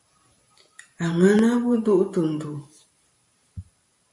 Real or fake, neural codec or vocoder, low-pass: fake; vocoder, 44.1 kHz, 128 mel bands every 512 samples, BigVGAN v2; 10.8 kHz